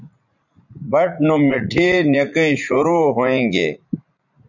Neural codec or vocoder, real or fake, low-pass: vocoder, 44.1 kHz, 80 mel bands, Vocos; fake; 7.2 kHz